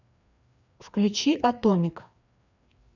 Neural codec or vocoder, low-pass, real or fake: codec, 16 kHz, 2 kbps, FreqCodec, larger model; 7.2 kHz; fake